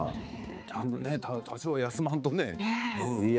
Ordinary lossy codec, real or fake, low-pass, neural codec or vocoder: none; fake; none; codec, 16 kHz, 4 kbps, X-Codec, HuBERT features, trained on balanced general audio